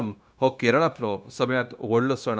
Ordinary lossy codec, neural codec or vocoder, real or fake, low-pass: none; codec, 16 kHz, 0.9 kbps, LongCat-Audio-Codec; fake; none